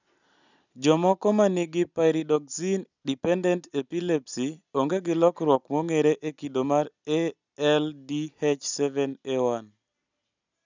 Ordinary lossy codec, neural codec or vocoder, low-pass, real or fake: none; none; 7.2 kHz; real